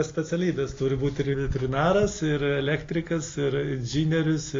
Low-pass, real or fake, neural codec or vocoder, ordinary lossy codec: 7.2 kHz; real; none; AAC, 32 kbps